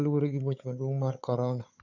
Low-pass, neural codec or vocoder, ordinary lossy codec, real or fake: 7.2 kHz; codec, 16 kHz, 4 kbps, FunCodec, trained on Chinese and English, 50 frames a second; none; fake